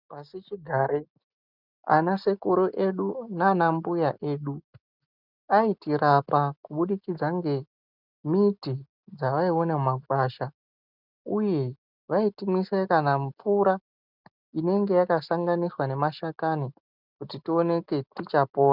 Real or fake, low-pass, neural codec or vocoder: real; 5.4 kHz; none